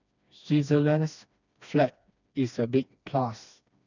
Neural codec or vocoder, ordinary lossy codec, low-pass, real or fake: codec, 16 kHz, 2 kbps, FreqCodec, smaller model; none; 7.2 kHz; fake